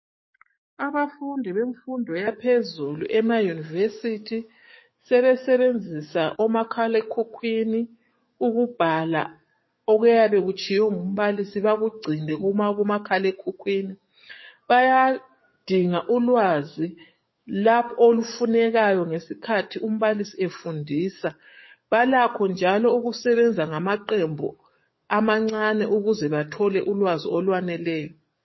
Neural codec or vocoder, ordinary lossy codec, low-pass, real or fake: codec, 44.1 kHz, 7.8 kbps, DAC; MP3, 24 kbps; 7.2 kHz; fake